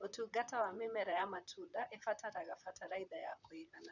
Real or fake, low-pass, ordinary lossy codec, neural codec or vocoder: fake; 7.2 kHz; none; vocoder, 44.1 kHz, 128 mel bands, Pupu-Vocoder